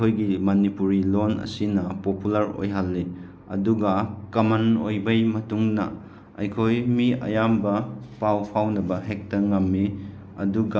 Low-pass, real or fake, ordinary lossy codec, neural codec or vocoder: none; real; none; none